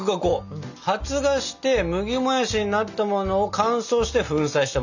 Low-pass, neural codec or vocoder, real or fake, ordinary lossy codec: 7.2 kHz; none; real; none